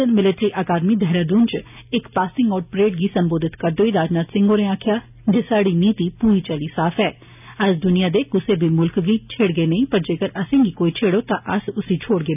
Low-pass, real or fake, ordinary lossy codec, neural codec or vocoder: 3.6 kHz; real; none; none